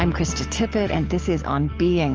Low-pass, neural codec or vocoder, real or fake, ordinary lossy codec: 7.2 kHz; codec, 44.1 kHz, 7.8 kbps, DAC; fake; Opus, 24 kbps